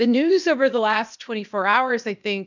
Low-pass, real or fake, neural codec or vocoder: 7.2 kHz; fake; codec, 16 kHz, 0.8 kbps, ZipCodec